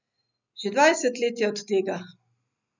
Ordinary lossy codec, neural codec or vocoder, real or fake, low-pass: none; none; real; 7.2 kHz